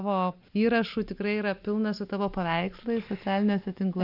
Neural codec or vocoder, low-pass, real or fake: none; 5.4 kHz; real